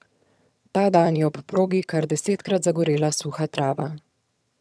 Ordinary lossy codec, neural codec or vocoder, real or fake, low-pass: none; vocoder, 22.05 kHz, 80 mel bands, HiFi-GAN; fake; none